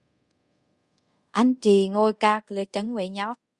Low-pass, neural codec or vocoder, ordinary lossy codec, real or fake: 10.8 kHz; codec, 24 kHz, 0.5 kbps, DualCodec; Opus, 64 kbps; fake